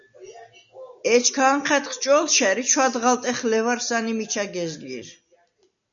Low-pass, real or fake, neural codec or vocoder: 7.2 kHz; real; none